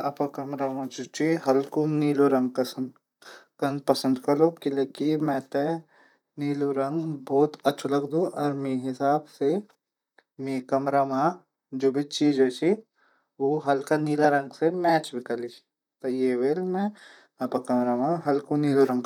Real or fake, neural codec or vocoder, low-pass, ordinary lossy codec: fake; vocoder, 44.1 kHz, 128 mel bands, Pupu-Vocoder; 19.8 kHz; none